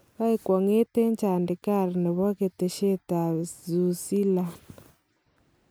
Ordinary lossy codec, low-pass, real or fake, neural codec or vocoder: none; none; real; none